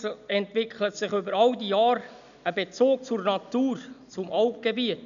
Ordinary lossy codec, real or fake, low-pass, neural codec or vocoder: none; real; 7.2 kHz; none